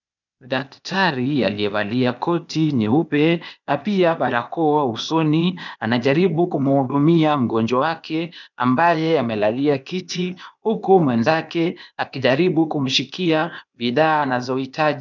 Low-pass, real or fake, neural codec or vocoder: 7.2 kHz; fake; codec, 16 kHz, 0.8 kbps, ZipCodec